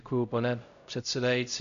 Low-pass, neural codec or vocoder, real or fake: 7.2 kHz; codec, 16 kHz, 0.5 kbps, X-Codec, HuBERT features, trained on LibriSpeech; fake